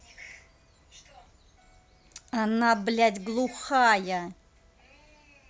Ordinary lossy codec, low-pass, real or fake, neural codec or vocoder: none; none; real; none